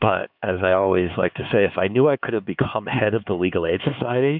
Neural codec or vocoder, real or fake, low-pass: codec, 16 kHz, 4 kbps, X-Codec, HuBERT features, trained on LibriSpeech; fake; 5.4 kHz